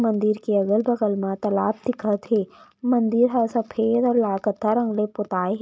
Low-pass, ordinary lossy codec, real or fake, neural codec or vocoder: none; none; real; none